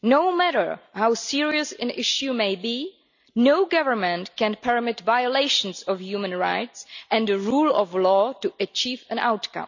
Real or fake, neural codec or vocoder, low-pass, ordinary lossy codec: real; none; 7.2 kHz; none